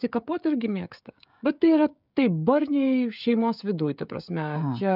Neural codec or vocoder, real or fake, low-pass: codec, 16 kHz, 16 kbps, FreqCodec, smaller model; fake; 5.4 kHz